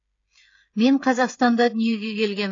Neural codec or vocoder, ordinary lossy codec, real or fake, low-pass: codec, 16 kHz, 16 kbps, FreqCodec, smaller model; AAC, 32 kbps; fake; 7.2 kHz